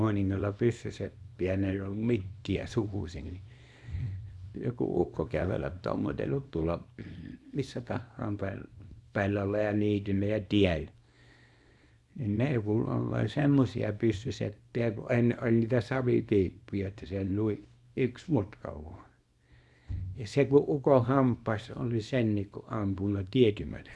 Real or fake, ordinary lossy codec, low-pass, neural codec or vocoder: fake; none; none; codec, 24 kHz, 0.9 kbps, WavTokenizer, small release